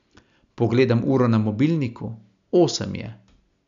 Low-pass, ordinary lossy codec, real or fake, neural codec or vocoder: 7.2 kHz; none; real; none